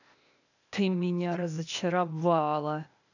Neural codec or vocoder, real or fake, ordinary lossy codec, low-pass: codec, 16 kHz, 0.8 kbps, ZipCodec; fake; none; 7.2 kHz